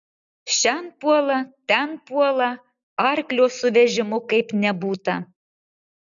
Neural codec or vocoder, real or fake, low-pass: none; real; 7.2 kHz